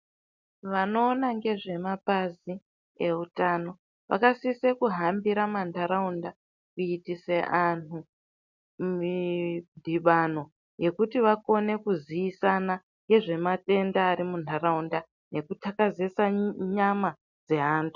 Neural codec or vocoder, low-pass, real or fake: none; 7.2 kHz; real